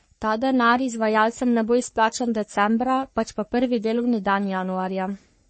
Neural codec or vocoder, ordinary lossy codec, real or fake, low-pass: codec, 44.1 kHz, 3.4 kbps, Pupu-Codec; MP3, 32 kbps; fake; 10.8 kHz